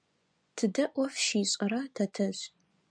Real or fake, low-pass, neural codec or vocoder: fake; 9.9 kHz; vocoder, 24 kHz, 100 mel bands, Vocos